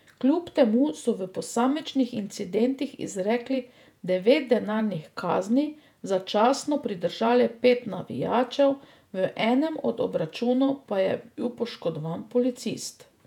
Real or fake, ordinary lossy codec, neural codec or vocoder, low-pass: fake; none; vocoder, 48 kHz, 128 mel bands, Vocos; 19.8 kHz